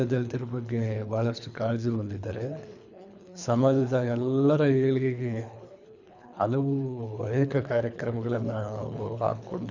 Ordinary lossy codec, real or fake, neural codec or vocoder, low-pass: none; fake; codec, 24 kHz, 3 kbps, HILCodec; 7.2 kHz